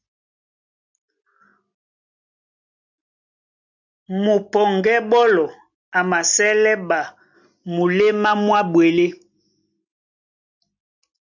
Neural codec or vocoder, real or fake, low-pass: none; real; 7.2 kHz